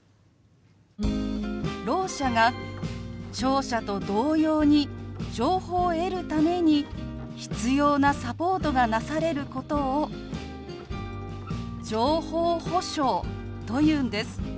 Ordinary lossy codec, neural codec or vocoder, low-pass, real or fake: none; none; none; real